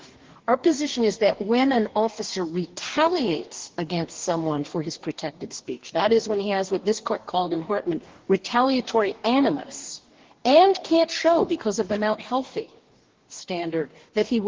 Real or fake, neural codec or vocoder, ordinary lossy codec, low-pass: fake; codec, 44.1 kHz, 2.6 kbps, DAC; Opus, 16 kbps; 7.2 kHz